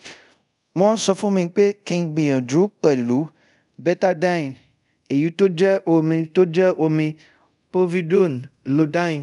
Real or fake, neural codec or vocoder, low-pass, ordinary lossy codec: fake; codec, 24 kHz, 0.5 kbps, DualCodec; 10.8 kHz; none